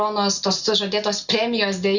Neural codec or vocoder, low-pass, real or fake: none; 7.2 kHz; real